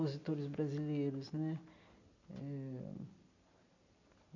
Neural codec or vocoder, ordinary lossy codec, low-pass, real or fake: none; none; 7.2 kHz; real